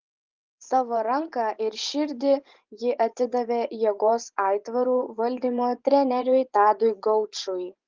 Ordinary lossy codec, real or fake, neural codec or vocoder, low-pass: Opus, 32 kbps; fake; vocoder, 24 kHz, 100 mel bands, Vocos; 7.2 kHz